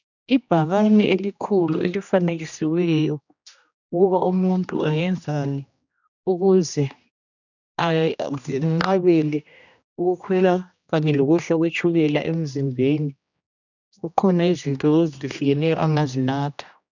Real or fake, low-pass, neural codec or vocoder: fake; 7.2 kHz; codec, 16 kHz, 1 kbps, X-Codec, HuBERT features, trained on general audio